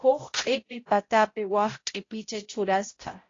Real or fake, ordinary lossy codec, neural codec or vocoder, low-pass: fake; AAC, 32 kbps; codec, 16 kHz, 0.5 kbps, X-Codec, HuBERT features, trained on balanced general audio; 7.2 kHz